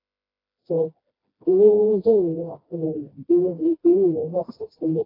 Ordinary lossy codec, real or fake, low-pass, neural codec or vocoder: AAC, 24 kbps; fake; 5.4 kHz; codec, 16 kHz, 1 kbps, FreqCodec, smaller model